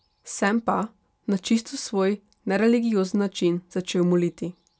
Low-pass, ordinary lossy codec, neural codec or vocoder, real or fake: none; none; none; real